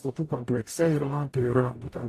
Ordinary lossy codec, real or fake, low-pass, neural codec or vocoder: AAC, 48 kbps; fake; 14.4 kHz; codec, 44.1 kHz, 0.9 kbps, DAC